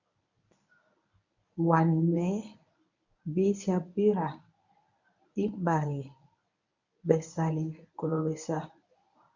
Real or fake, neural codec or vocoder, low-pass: fake; codec, 24 kHz, 0.9 kbps, WavTokenizer, medium speech release version 1; 7.2 kHz